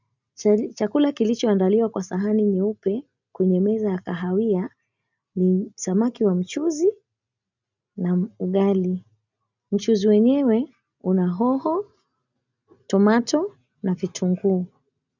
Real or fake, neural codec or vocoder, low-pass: real; none; 7.2 kHz